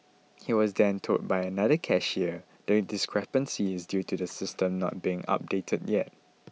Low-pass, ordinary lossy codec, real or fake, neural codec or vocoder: none; none; real; none